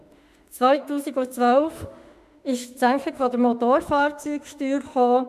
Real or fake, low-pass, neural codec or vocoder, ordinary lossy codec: fake; 14.4 kHz; autoencoder, 48 kHz, 32 numbers a frame, DAC-VAE, trained on Japanese speech; none